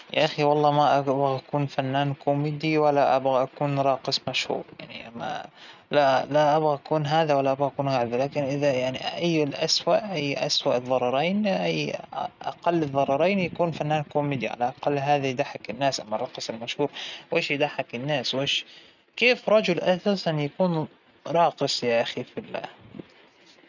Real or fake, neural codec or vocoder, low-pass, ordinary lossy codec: real; none; 7.2 kHz; none